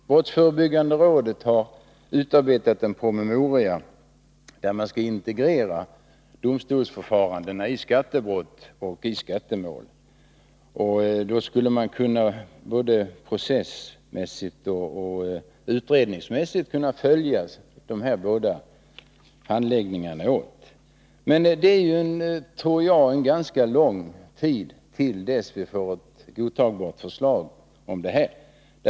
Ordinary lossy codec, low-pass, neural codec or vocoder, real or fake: none; none; none; real